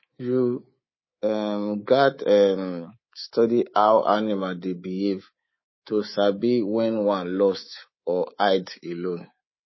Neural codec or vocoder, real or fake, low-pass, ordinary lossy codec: codec, 24 kHz, 3.1 kbps, DualCodec; fake; 7.2 kHz; MP3, 24 kbps